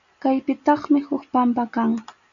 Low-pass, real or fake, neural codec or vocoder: 7.2 kHz; real; none